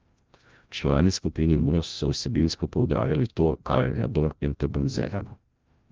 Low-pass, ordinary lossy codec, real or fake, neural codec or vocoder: 7.2 kHz; Opus, 24 kbps; fake; codec, 16 kHz, 0.5 kbps, FreqCodec, larger model